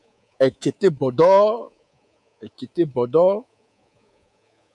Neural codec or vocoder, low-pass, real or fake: codec, 24 kHz, 3.1 kbps, DualCodec; 10.8 kHz; fake